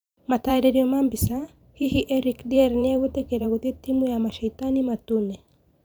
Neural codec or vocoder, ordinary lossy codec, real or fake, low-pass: vocoder, 44.1 kHz, 128 mel bands every 512 samples, BigVGAN v2; none; fake; none